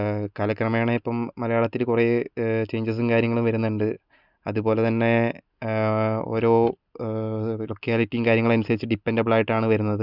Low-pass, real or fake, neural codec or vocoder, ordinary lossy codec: 5.4 kHz; real; none; none